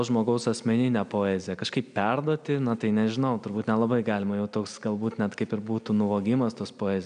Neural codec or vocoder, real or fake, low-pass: none; real; 9.9 kHz